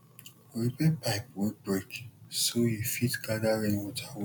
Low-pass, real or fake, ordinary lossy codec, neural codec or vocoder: none; real; none; none